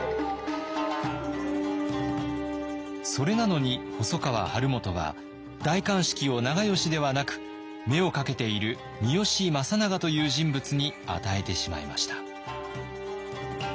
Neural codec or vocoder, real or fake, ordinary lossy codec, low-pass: none; real; none; none